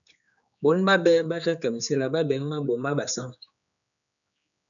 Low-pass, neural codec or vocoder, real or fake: 7.2 kHz; codec, 16 kHz, 4 kbps, X-Codec, HuBERT features, trained on general audio; fake